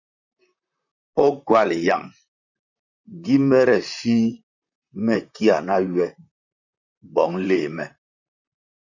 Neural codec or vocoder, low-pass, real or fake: vocoder, 44.1 kHz, 128 mel bands, Pupu-Vocoder; 7.2 kHz; fake